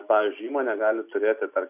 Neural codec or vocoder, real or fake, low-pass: none; real; 3.6 kHz